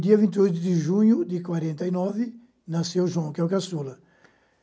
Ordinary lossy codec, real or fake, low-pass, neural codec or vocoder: none; real; none; none